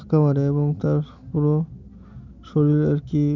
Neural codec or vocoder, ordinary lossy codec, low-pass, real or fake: none; none; 7.2 kHz; real